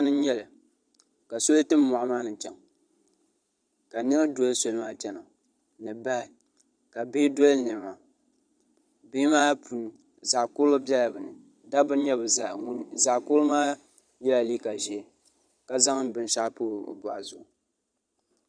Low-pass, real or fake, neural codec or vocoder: 9.9 kHz; fake; vocoder, 22.05 kHz, 80 mel bands, Vocos